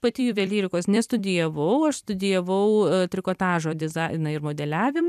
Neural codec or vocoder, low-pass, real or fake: vocoder, 44.1 kHz, 128 mel bands every 256 samples, BigVGAN v2; 14.4 kHz; fake